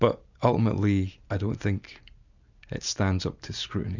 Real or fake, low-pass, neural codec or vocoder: real; 7.2 kHz; none